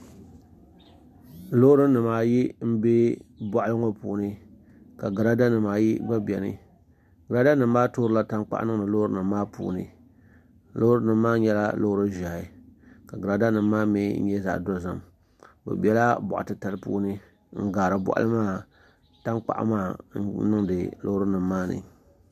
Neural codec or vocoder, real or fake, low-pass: none; real; 14.4 kHz